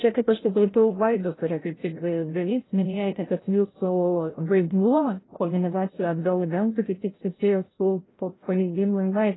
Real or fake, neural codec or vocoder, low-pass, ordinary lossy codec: fake; codec, 16 kHz, 0.5 kbps, FreqCodec, larger model; 7.2 kHz; AAC, 16 kbps